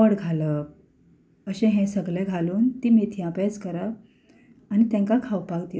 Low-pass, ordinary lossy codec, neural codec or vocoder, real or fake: none; none; none; real